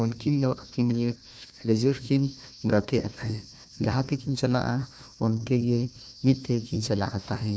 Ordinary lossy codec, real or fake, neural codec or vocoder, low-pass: none; fake; codec, 16 kHz, 1 kbps, FunCodec, trained on Chinese and English, 50 frames a second; none